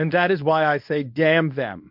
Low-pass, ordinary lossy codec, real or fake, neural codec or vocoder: 5.4 kHz; MP3, 48 kbps; fake; codec, 24 kHz, 0.9 kbps, WavTokenizer, medium speech release version 1